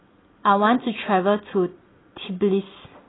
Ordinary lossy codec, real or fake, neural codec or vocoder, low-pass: AAC, 16 kbps; real; none; 7.2 kHz